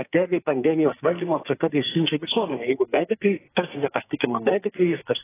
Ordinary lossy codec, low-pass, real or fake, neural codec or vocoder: AAC, 16 kbps; 3.6 kHz; fake; codec, 44.1 kHz, 2.6 kbps, SNAC